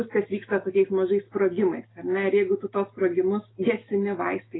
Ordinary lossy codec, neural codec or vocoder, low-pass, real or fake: AAC, 16 kbps; none; 7.2 kHz; real